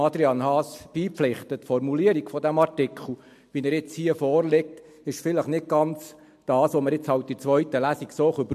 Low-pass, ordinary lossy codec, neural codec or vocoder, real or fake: 14.4 kHz; MP3, 64 kbps; none; real